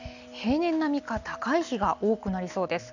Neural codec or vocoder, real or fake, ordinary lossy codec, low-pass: none; real; none; 7.2 kHz